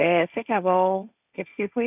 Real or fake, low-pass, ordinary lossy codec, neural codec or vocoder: fake; 3.6 kHz; none; codec, 16 kHz, 1.1 kbps, Voila-Tokenizer